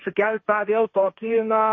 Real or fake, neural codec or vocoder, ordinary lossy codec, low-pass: fake; codec, 16 kHz, 1.1 kbps, Voila-Tokenizer; MP3, 32 kbps; 7.2 kHz